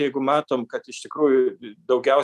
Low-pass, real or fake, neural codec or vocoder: 14.4 kHz; real; none